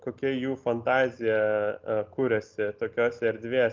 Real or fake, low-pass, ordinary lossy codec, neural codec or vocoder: real; 7.2 kHz; Opus, 24 kbps; none